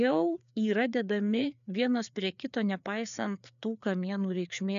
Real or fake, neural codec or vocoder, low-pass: fake; codec, 16 kHz, 4 kbps, FreqCodec, larger model; 7.2 kHz